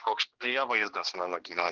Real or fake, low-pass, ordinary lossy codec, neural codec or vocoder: fake; 7.2 kHz; Opus, 16 kbps; codec, 16 kHz, 2 kbps, X-Codec, HuBERT features, trained on general audio